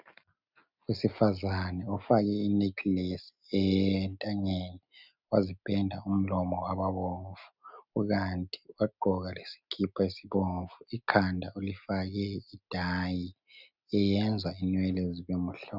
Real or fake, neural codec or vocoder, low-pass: real; none; 5.4 kHz